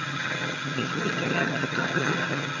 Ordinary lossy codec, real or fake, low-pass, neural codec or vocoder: none; fake; 7.2 kHz; vocoder, 22.05 kHz, 80 mel bands, HiFi-GAN